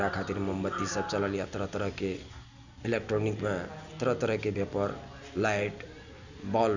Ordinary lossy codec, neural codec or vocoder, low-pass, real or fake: none; none; 7.2 kHz; real